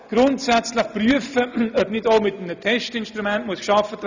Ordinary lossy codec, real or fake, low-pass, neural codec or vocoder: none; real; 7.2 kHz; none